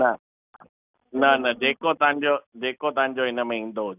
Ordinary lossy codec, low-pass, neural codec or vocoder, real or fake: none; 3.6 kHz; none; real